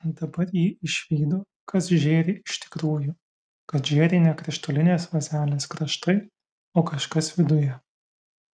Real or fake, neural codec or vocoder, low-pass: real; none; 9.9 kHz